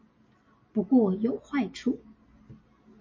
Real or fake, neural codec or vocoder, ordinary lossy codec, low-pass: real; none; MP3, 32 kbps; 7.2 kHz